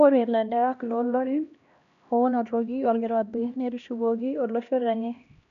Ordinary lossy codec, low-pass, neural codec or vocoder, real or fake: none; 7.2 kHz; codec, 16 kHz, 1 kbps, X-Codec, HuBERT features, trained on LibriSpeech; fake